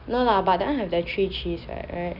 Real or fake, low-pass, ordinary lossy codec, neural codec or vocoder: real; 5.4 kHz; none; none